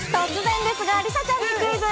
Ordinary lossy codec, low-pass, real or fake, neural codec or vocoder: none; none; real; none